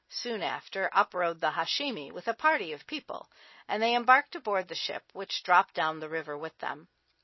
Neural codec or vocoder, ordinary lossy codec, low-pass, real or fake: none; MP3, 24 kbps; 7.2 kHz; real